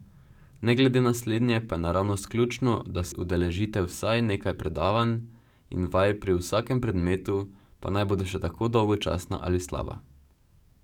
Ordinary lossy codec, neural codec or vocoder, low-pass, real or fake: none; codec, 44.1 kHz, 7.8 kbps, DAC; 19.8 kHz; fake